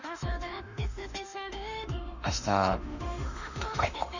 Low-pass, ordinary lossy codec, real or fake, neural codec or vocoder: 7.2 kHz; none; fake; autoencoder, 48 kHz, 32 numbers a frame, DAC-VAE, trained on Japanese speech